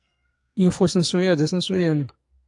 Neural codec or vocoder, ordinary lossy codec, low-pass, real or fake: codec, 44.1 kHz, 2.6 kbps, SNAC; AAC, 64 kbps; 10.8 kHz; fake